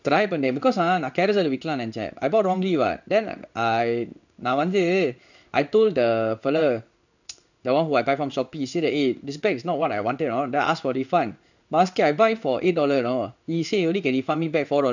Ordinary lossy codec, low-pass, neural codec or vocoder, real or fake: none; 7.2 kHz; codec, 16 kHz in and 24 kHz out, 1 kbps, XY-Tokenizer; fake